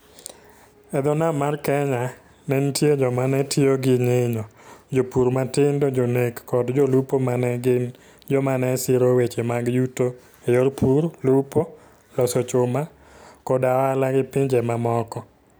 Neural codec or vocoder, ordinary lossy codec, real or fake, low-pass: none; none; real; none